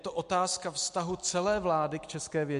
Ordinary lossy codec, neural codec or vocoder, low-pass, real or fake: MP3, 64 kbps; none; 10.8 kHz; real